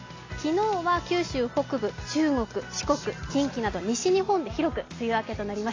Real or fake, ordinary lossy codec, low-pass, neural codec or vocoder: real; AAC, 32 kbps; 7.2 kHz; none